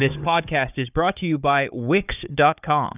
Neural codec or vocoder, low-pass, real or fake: codec, 16 kHz, 4 kbps, FunCodec, trained on Chinese and English, 50 frames a second; 3.6 kHz; fake